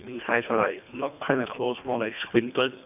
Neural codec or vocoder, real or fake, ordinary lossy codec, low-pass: codec, 24 kHz, 1.5 kbps, HILCodec; fake; none; 3.6 kHz